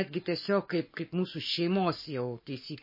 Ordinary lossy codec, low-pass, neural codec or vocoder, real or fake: MP3, 24 kbps; 5.4 kHz; codec, 44.1 kHz, 7.8 kbps, Pupu-Codec; fake